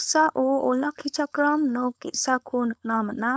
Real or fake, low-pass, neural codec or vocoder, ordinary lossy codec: fake; none; codec, 16 kHz, 4.8 kbps, FACodec; none